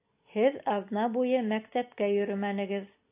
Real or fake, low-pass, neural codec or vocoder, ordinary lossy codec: real; 3.6 kHz; none; AAC, 24 kbps